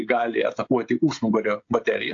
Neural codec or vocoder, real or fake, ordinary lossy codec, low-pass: codec, 16 kHz, 16 kbps, FreqCodec, smaller model; fake; AAC, 48 kbps; 7.2 kHz